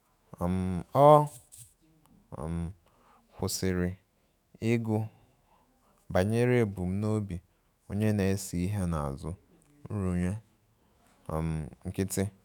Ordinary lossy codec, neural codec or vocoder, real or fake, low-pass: none; autoencoder, 48 kHz, 128 numbers a frame, DAC-VAE, trained on Japanese speech; fake; none